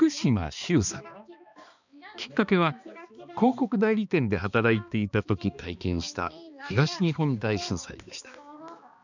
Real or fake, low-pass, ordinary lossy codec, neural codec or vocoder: fake; 7.2 kHz; none; codec, 16 kHz, 2 kbps, X-Codec, HuBERT features, trained on balanced general audio